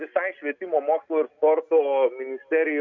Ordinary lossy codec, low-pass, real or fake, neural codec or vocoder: MP3, 64 kbps; 7.2 kHz; real; none